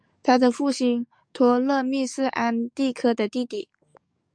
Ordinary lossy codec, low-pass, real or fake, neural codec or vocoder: MP3, 96 kbps; 9.9 kHz; fake; codec, 44.1 kHz, 7.8 kbps, DAC